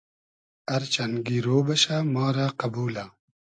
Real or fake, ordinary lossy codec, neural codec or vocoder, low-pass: real; AAC, 64 kbps; none; 9.9 kHz